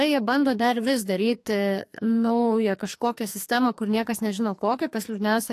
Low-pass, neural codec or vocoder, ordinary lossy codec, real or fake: 14.4 kHz; codec, 44.1 kHz, 2.6 kbps, SNAC; AAC, 64 kbps; fake